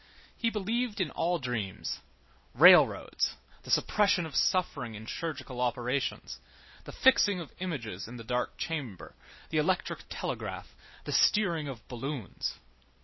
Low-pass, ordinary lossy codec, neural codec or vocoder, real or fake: 7.2 kHz; MP3, 24 kbps; none; real